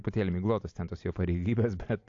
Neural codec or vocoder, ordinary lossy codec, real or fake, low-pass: none; AAC, 48 kbps; real; 7.2 kHz